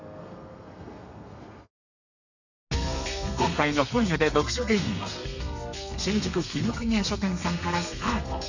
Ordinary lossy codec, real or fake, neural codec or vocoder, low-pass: none; fake; codec, 32 kHz, 1.9 kbps, SNAC; 7.2 kHz